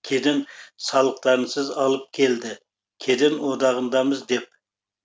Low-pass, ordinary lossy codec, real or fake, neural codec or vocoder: none; none; real; none